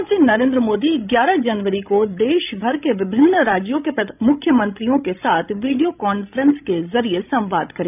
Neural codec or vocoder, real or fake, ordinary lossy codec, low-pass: codec, 16 kHz, 16 kbps, FreqCodec, larger model; fake; none; 3.6 kHz